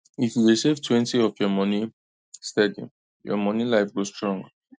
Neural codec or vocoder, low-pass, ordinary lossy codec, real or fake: none; none; none; real